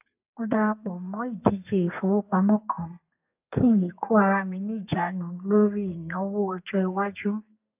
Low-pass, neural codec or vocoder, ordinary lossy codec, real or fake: 3.6 kHz; codec, 32 kHz, 1.9 kbps, SNAC; AAC, 32 kbps; fake